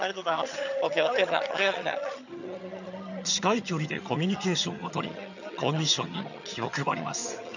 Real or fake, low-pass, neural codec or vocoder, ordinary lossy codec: fake; 7.2 kHz; vocoder, 22.05 kHz, 80 mel bands, HiFi-GAN; none